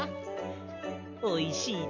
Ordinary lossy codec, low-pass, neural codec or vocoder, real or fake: none; 7.2 kHz; none; real